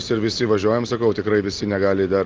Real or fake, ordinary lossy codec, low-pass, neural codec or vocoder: real; Opus, 32 kbps; 7.2 kHz; none